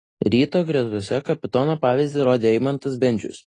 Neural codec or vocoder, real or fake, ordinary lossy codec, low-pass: none; real; AAC, 32 kbps; 10.8 kHz